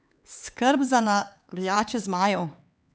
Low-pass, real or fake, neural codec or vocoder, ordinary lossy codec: none; fake; codec, 16 kHz, 4 kbps, X-Codec, HuBERT features, trained on LibriSpeech; none